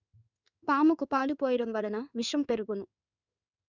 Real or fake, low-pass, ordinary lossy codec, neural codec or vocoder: fake; 7.2 kHz; none; codec, 16 kHz in and 24 kHz out, 1 kbps, XY-Tokenizer